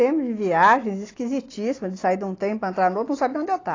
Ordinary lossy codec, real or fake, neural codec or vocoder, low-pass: AAC, 32 kbps; real; none; 7.2 kHz